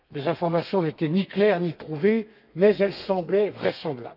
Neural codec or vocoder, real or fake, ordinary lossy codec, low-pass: codec, 32 kHz, 1.9 kbps, SNAC; fake; none; 5.4 kHz